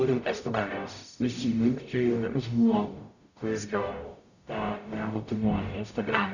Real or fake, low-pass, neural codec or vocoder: fake; 7.2 kHz; codec, 44.1 kHz, 0.9 kbps, DAC